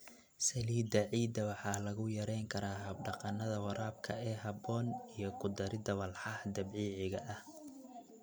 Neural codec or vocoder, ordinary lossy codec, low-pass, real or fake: none; none; none; real